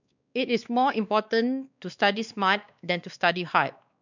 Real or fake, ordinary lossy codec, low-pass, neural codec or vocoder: fake; none; 7.2 kHz; codec, 16 kHz, 2 kbps, X-Codec, WavLM features, trained on Multilingual LibriSpeech